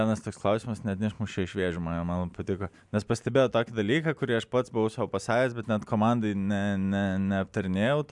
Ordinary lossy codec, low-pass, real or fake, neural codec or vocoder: MP3, 96 kbps; 10.8 kHz; real; none